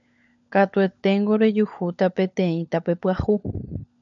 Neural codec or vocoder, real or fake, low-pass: codec, 16 kHz, 16 kbps, FunCodec, trained on LibriTTS, 50 frames a second; fake; 7.2 kHz